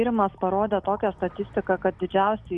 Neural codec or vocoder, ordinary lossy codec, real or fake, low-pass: none; Opus, 64 kbps; real; 10.8 kHz